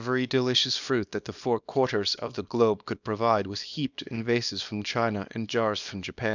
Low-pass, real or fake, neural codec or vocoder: 7.2 kHz; fake; codec, 24 kHz, 0.9 kbps, WavTokenizer, small release